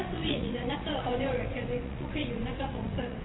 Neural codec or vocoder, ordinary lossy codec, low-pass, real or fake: vocoder, 22.05 kHz, 80 mel bands, WaveNeXt; AAC, 16 kbps; 7.2 kHz; fake